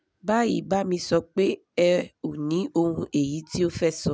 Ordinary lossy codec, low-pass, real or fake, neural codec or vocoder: none; none; real; none